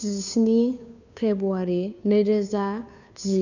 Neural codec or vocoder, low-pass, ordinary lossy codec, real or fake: codec, 16 kHz, 2 kbps, FunCodec, trained on Chinese and English, 25 frames a second; 7.2 kHz; none; fake